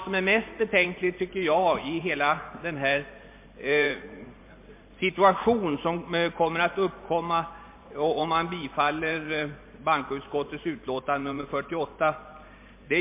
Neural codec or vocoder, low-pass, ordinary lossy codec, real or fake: vocoder, 44.1 kHz, 128 mel bands every 512 samples, BigVGAN v2; 3.6 kHz; MP3, 24 kbps; fake